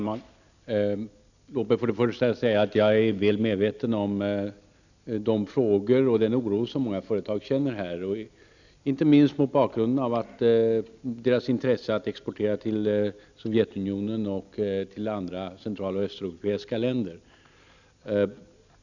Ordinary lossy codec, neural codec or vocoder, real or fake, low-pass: none; none; real; 7.2 kHz